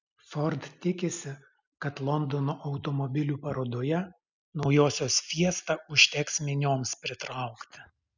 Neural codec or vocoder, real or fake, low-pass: none; real; 7.2 kHz